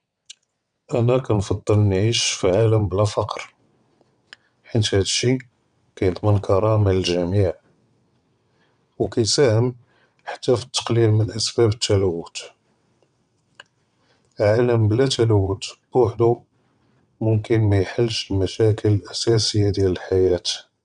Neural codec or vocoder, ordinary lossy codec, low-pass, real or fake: vocoder, 22.05 kHz, 80 mel bands, WaveNeXt; none; 9.9 kHz; fake